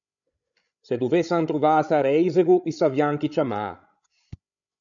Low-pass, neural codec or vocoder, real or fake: 7.2 kHz; codec, 16 kHz, 16 kbps, FreqCodec, larger model; fake